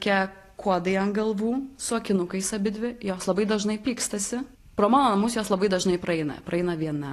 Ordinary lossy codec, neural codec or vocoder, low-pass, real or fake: AAC, 48 kbps; none; 14.4 kHz; real